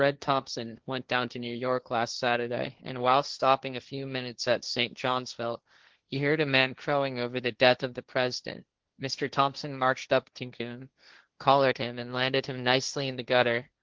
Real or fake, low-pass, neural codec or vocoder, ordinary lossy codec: fake; 7.2 kHz; codec, 16 kHz, 1.1 kbps, Voila-Tokenizer; Opus, 16 kbps